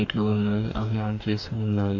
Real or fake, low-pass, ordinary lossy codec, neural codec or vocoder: fake; 7.2 kHz; MP3, 64 kbps; codec, 44.1 kHz, 2.6 kbps, DAC